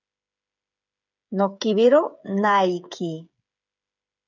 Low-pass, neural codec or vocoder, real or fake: 7.2 kHz; codec, 16 kHz, 8 kbps, FreqCodec, smaller model; fake